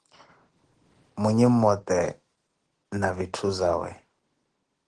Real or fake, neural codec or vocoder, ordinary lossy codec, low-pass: real; none; Opus, 16 kbps; 9.9 kHz